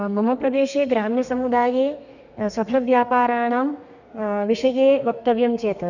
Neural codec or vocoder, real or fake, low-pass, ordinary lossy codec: codec, 32 kHz, 1.9 kbps, SNAC; fake; 7.2 kHz; none